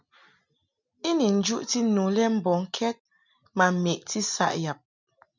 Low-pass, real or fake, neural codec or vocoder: 7.2 kHz; real; none